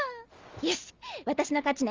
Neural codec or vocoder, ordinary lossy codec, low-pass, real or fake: none; Opus, 32 kbps; 7.2 kHz; real